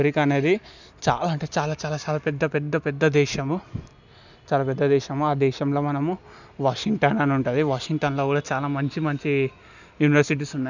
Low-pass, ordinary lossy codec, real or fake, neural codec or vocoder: 7.2 kHz; none; real; none